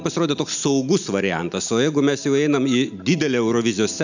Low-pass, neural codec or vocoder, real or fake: 7.2 kHz; none; real